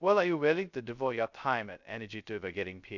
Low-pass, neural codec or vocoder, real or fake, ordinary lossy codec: 7.2 kHz; codec, 16 kHz, 0.2 kbps, FocalCodec; fake; none